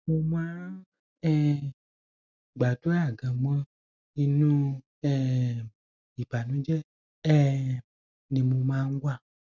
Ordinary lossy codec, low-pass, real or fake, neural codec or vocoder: none; 7.2 kHz; real; none